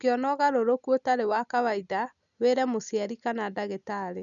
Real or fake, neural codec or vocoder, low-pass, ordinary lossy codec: real; none; 7.2 kHz; none